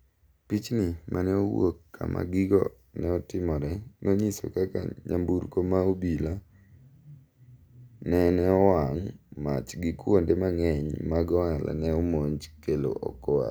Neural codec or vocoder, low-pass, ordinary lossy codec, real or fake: none; none; none; real